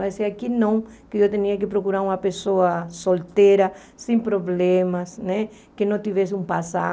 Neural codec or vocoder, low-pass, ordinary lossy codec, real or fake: none; none; none; real